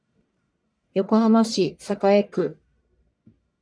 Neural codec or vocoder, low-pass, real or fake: codec, 44.1 kHz, 1.7 kbps, Pupu-Codec; 9.9 kHz; fake